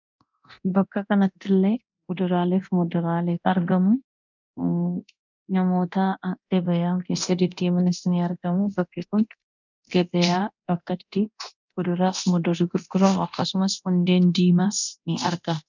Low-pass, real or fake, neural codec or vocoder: 7.2 kHz; fake; codec, 24 kHz, 0.9 kbps, DualCodec